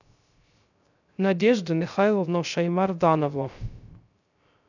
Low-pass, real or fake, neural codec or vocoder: 7.2 kHz; fake; codec, 16 kHz, 0.3 kbps, FocalCodec